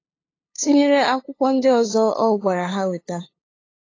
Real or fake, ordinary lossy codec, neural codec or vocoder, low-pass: fake; AAC, 32 kbps; codec, 16 kHz, 2 kbps, FunCodec, trained on LibriTTS, 25 frames a second; 7.2 kHz